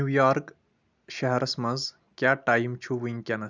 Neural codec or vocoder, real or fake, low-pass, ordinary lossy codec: none; real; 7.2 kHz; none